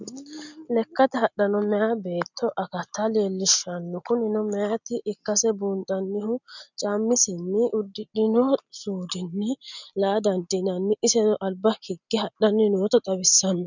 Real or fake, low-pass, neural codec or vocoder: real; 7.2 kHz; none